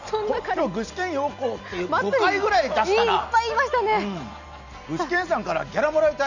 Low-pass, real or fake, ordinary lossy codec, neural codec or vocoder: 7.2 kHz; real; none; none